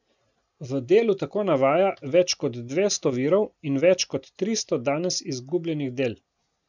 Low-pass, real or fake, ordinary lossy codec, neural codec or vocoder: 7.2 kHz; real; none; none